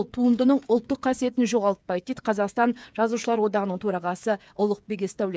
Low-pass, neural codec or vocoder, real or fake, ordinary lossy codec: none; codec, 16 kHz, 8 kbps, FreqCodec, smaller model; fake; none